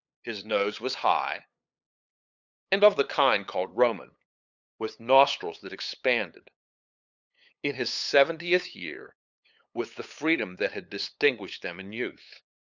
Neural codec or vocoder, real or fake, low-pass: codec, 16 kHz, 8 kbps, FunCodec, trained on LibriTTS, 25 frames a second; fake; 7.2 kHz